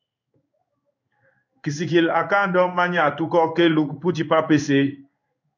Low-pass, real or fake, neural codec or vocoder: 7.2 kHz; fake; codec, 16 kHz in and 24 kHz out, 1 kbps, XY-Tokenizer